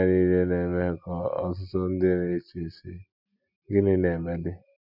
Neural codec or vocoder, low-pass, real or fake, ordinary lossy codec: none; 5.4 kHz; real; none